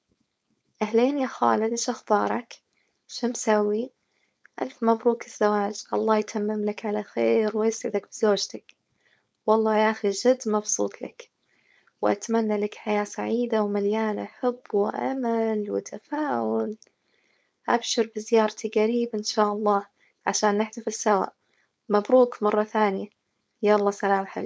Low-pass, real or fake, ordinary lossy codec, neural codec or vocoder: none; fake; none; codec, 16 kHz, 4.8 kbps, FACodec